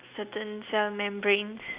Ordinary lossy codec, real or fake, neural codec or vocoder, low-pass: Opus, 64 kbps; real; none; 3.6 kHz